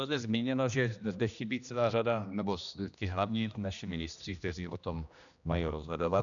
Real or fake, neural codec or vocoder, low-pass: fake; codec, 16 kHz, 1 kbps, X-Codec, HuBERT features, trained on general audio; 7.2 kHz